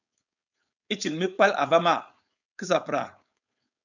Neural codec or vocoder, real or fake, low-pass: codec, 16 kHz, 4.8 kbps, FACodec; fake; 7.2 kHz